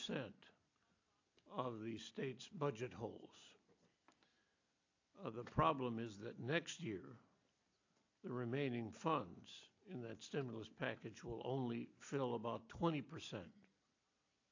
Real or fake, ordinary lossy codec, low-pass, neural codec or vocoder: real; AAC, 48 kbps; 7.2 kHz; none